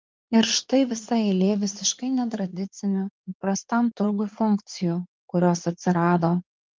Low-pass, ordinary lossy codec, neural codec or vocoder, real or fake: 7.2 kHz; Opus, 32 kbps; codec, 16 kHz in and 24 kHz out, 2.2 kbps, FireRedTTS-2 codec; fake